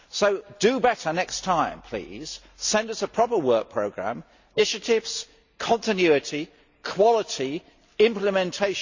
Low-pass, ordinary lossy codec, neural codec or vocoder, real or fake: 7.2 kHz; Opus, 64 kbps; none; real